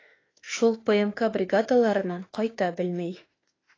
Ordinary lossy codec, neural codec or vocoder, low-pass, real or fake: AAC, 32 kbps; autoencoder, 48 kHz, 32 numbers a frame, DAC-VAE, trained on Japanese speech; 7.2 kHz; fake